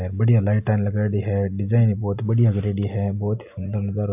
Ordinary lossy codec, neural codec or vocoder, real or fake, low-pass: AAC, 32 kbps; none; real; 3.6 kHz